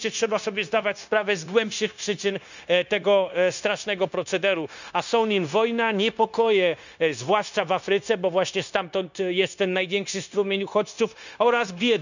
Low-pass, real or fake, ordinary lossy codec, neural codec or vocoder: 7.2 kHz; fake; none; codec, 16 kHz, 0.9 kbps, LongCat-Audio-Codec